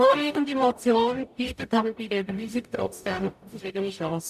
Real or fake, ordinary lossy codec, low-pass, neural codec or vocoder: fake; none; 14.4 kHz; codec, 44.1 kHz, 0.9 kbps, DAC